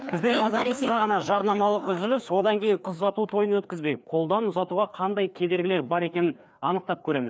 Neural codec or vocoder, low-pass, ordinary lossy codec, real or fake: codec, 16 kHz, 2 kbps, FreqCodec, larger model; none; none; fake